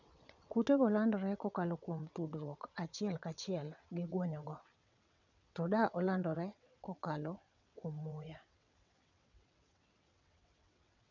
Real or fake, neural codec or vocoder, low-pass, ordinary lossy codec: fake; vocoder, 22.05 kHz, 80 mel bands, WaveNeXt; 7.2 kHz; none